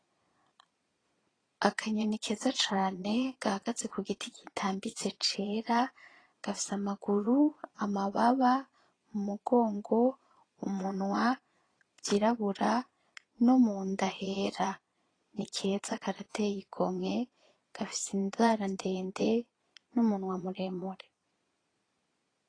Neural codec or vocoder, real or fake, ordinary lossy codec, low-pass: vocoder, 22.05 kHz, 80 mel bands, Vocos; fake; AAC, 32 kbps; 9.9 kHz